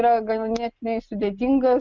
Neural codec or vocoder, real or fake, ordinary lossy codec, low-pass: none; real; Opus, 32 kbps; 7.2 kHz